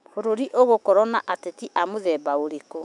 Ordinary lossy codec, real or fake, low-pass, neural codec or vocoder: none; fake; 10.8 kHz; autoencoder, 48 kHz, 128 numbers a frame, DAC-VAE, trained on Japanese speech